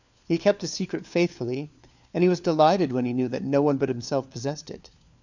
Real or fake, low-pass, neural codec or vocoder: fake; 7.2 kHz; codec, 16 kHz, 4 kbps, FunCodec, trained on LibriTTS, 50 frames a second